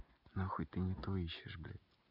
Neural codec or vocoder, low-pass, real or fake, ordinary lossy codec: none; 5.4 kHz; real; none